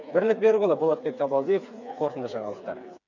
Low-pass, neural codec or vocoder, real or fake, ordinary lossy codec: 7.2 kHz; codec, 16 kHz, 4 kbps, FreqCodec, smaller model; fake; none